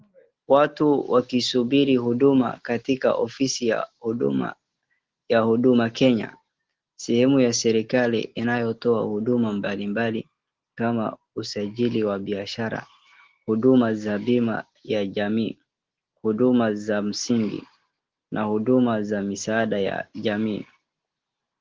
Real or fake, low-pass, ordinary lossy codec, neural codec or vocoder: real; 7.2 kHz; Opus, 16 kbps; none